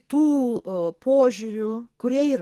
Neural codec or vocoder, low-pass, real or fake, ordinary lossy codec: codec, 44.1 kHz, 2.6 kbps, SNAC; 14.4 kHz; fake; Opus, 24 kbps